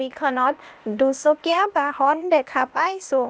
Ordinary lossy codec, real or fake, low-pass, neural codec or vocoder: none; fake; none; codec, 16 kHz, 0.8 kbps, ZipCodec